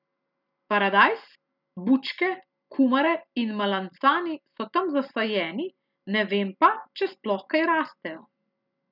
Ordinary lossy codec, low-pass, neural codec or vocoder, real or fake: none; 5.4 kHz; none; real